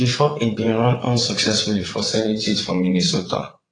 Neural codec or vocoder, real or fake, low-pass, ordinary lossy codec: vocoder, 22.05 kHz, 80 mel bands, WaveNeXt; fake; 9.9 kHz; AAC, 32 kbps